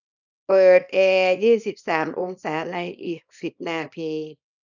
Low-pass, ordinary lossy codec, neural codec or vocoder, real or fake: 7.2 kHz; none; codec, 24 kHz, 0.9 kbps, WavTokenizer, small release; fake